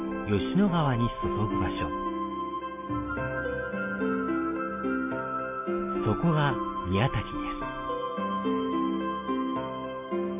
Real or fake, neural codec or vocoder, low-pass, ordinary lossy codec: real; none; 3.6 kHz; AAC, 32 kbps